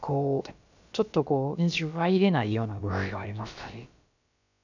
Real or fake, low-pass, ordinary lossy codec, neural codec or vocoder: fake; 7.2 kHz; none; codec, 16 kHz, about 1 kbps, DyCAST, with the encoder's durations